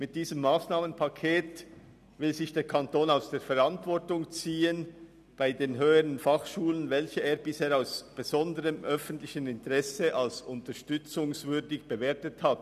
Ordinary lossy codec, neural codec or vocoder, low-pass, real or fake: none; none; 14.4 kHz; real